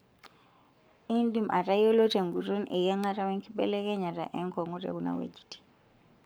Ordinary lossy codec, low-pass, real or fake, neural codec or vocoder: none; none; fake; codec, 44.1 kHz, 7.8 kbps, Pupu-Codec